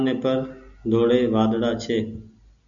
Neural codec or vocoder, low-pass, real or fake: none; 7.2 kHz; real